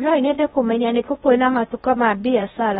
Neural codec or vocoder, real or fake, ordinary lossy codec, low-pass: codec, 16 kHz in and 24 kHz out, 0.6 kbps, FocalCodec, streaming, 2048 codes; fake; AAC, 16 kbps; 10.8 kHz